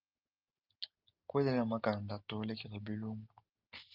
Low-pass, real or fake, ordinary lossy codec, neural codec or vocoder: 5.4 kHz; real; Opus, 32 kbps; none